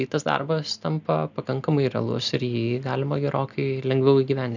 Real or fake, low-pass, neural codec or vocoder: real; 7.2 kHz; none